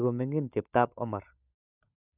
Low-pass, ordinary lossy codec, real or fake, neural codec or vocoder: 3.6 kHz; none; fake; codec, 16 kHz, 16 kbps, FunCodec, trained on LibriTTS, 50 frames a second